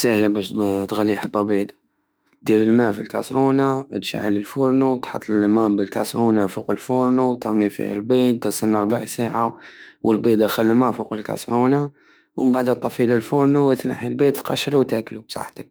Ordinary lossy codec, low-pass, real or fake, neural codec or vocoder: none; none; fake; autoencoder, 48 kHz, 32 numbers a frame, DAC-VAE, trained on Japanese speech